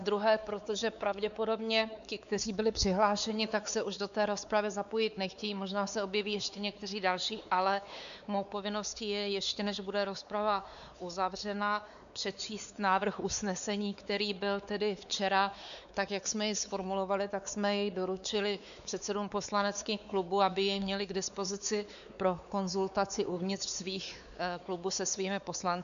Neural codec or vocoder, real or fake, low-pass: codec, 16 kHz, 4 kbps, X-Codec, WavLM features, trained on Multilingual LibriSpeech; fake; 7.2 kHz